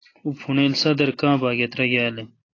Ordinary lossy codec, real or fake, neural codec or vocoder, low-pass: AAC, 32 kbps; real; none; 7.2 kHz